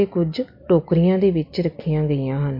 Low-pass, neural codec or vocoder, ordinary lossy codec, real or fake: 5.4 kHz; none; MP3, 32 kbps; real